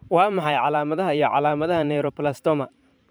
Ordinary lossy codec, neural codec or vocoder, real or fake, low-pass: none; vocoder, 44.1 kHz, 128 mel bands every 512 samples, BigVGAN v2; fake; none